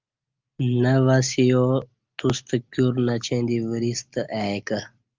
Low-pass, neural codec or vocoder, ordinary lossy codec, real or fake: 7.2 kHz; none; Opus, 24 kbps; real